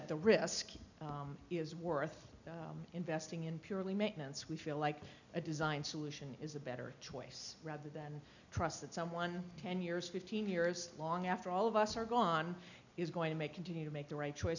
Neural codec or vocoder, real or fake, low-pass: none; real; 7.2 kHz